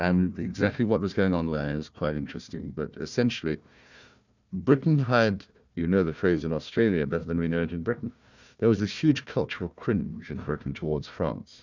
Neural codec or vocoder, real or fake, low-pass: codec, 16 kHz, 1 kbps, FunCodec, trained on Chinese and English, 50 frames a second; fake; 7.2 kHz